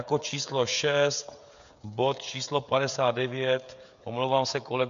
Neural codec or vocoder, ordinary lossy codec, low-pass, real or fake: codec, 16 kHz, 16 kbps, FreqCodec, smaller model; AAC, 96 kbps; 7.2 kHz; fake